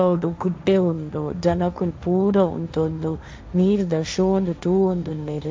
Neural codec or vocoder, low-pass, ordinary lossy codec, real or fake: codec, 16 kHz, 1.1 kbps, Voila-Tokenizer; none; none; fake